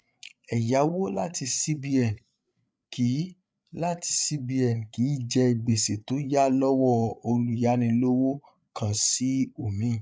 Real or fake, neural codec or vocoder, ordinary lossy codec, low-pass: fake; codec, 16 kHz, 8 kbps, FreqCodec, larger model; none; none